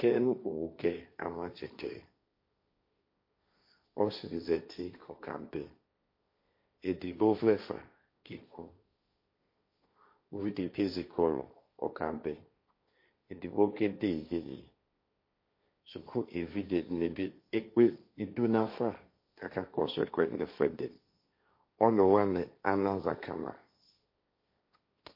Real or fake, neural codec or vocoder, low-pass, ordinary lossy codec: fake; codec, 16 kHz, 1.1 kbps, Voila-Tokenizer; 5.4 kHz; MP3, 32 kbps